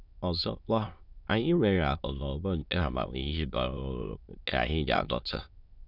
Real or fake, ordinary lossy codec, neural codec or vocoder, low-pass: fake; none; autoencoder, 22.05 kHz, a latent of 192 numbers a frame, VITS, trained on many speakers; 5.4 kHz